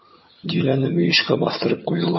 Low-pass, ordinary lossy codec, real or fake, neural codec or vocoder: 7.2 kHz; MP3, 24 kbps; fake; vocoder, 22.05 kHz, 80 mel bands, HiFi-GAN